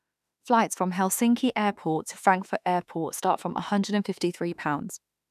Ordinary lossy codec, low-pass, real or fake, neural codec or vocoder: none; 14.4 kHz; fake; autoencoder, 48 kHz, 32 numbers a frame, DAC-VAE, trained on Japanese speech